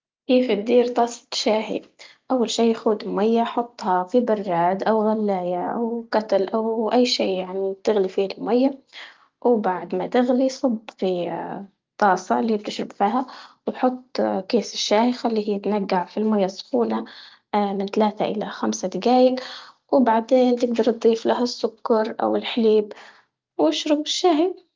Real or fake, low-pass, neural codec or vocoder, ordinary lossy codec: real; 7.2 kHz; none; Opus, 32 kbps